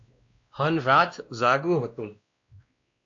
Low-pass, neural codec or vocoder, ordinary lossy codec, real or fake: 7.2 kHz; codec, 16 kHz, 1 kbps, X-Codec, WavLM features, trained on Multilingual LibriSpeech; AAC, 48 kbps; fake